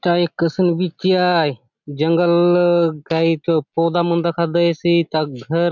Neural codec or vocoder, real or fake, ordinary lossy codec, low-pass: none; real; none; 7.2 kHz